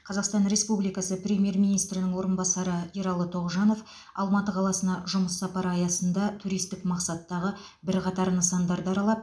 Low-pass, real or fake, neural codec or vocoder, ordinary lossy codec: none; real; none; none